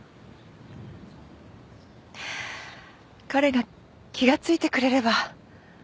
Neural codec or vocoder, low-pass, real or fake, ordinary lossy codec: none; none; real; none